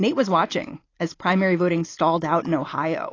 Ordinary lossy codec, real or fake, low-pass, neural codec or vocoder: AAC, 32 kbps; real; 7.2 kHz; none